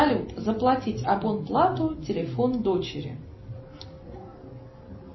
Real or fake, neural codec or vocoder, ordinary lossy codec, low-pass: real; none; MP3, 24 kbps; 7.2 kHz